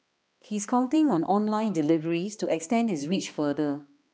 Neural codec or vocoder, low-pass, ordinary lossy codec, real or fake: codec, 16 kHz, 2 kbps, X-Codec, HuBERT features, trained on balanced general audio; none; none; fake